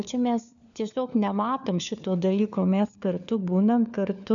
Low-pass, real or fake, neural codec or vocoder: 7.2 kHz; fake; codec, 16 kHz, 4 kbps, FunCodec, trained on LibriTTS, 50 frames a second